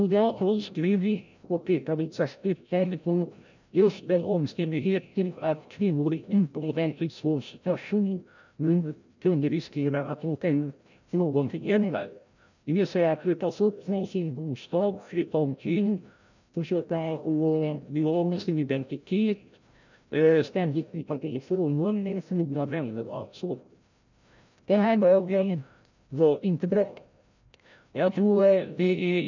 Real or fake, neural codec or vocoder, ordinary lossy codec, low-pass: fake; codec, 16 kHz, 0.5 kbps, FreqCodec, larger model; none; 7.2 kHz